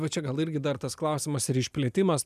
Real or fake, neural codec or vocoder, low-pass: real; none; 14.4 kHz